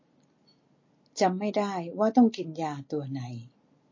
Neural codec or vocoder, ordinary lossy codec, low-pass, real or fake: none; MP3, 32 kbps; 7.2 kHz; real